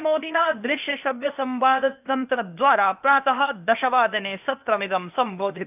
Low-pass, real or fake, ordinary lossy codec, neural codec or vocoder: 3.6 kHz; fake; none; codec, 16 kHz, 0.8 kbps, ZipCodec